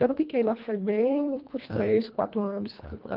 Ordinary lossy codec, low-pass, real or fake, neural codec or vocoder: Opus, 32 kbps; 5.4 kHz; fake; codec, 24 kHz, 1.5 kbps, HILCodec